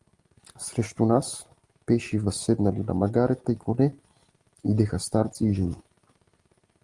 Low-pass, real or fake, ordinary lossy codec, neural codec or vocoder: 10.8 kHz; real; Opus, 24 kbps; none